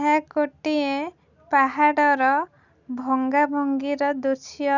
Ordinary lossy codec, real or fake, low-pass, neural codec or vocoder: none; real; 7.2 kHz; none